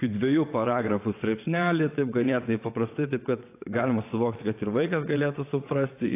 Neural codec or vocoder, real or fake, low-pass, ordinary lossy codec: vocoder, 22.05 kHz, 80 mel bands, WaveNeXt; fake; 3.6 kHz; AAC, 24 kbps